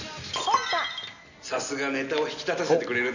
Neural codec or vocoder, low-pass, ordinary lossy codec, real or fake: none; 7.2 kHz; none; real